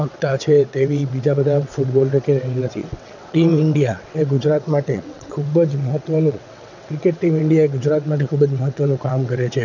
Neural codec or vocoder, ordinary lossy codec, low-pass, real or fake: vocoder, 22.05 kHz, 80 mel bands, WaveNeXt; none; 7.2 kHz; fake